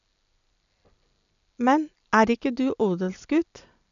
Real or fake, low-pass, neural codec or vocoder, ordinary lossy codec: real; 7.2 kHz; none; none